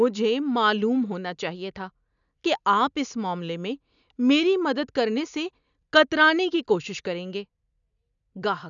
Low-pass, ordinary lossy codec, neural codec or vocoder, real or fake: 7.2 kHz; none; none; real